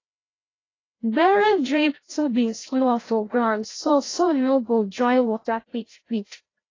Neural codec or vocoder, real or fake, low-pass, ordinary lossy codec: codec, 16 kHz, 0.5 kbps, FreqCodec, larger model; fake; 7.2 kHz; AAC, 32 kbps